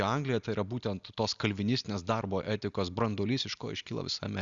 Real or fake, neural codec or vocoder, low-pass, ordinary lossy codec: real; none; 7.2 kHz; Opus, 64 kbps